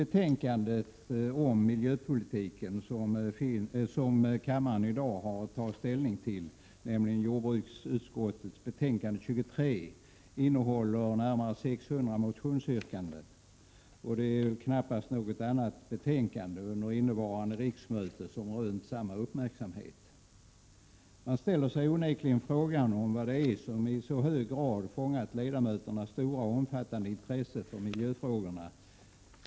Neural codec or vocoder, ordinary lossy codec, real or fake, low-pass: none; none; real; none